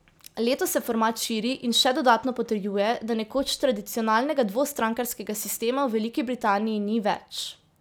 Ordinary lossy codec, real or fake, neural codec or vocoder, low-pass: none; real; none; none